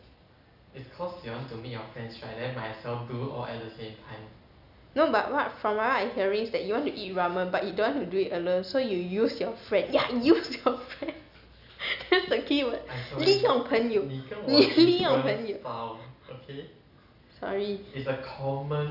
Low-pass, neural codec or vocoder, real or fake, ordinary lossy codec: 5.4 kHz; none; real; none